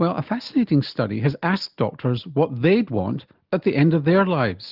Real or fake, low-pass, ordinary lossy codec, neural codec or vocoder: real; 5.4 kHz; Opus, 24 kbps; none